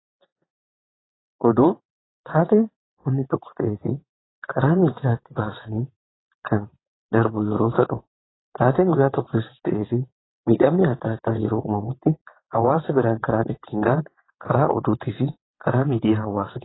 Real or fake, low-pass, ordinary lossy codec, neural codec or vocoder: fake; 7.2 kHz; AAC, 16 kbps; vocoder, 22.05 kHz, 80 mel bands, WaveNeXt